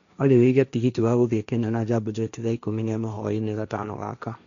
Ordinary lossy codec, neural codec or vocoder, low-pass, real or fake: none; codec, 16 kHz, 1.1 kbps, Voila-Tokenizer; 7.2 kHz; fake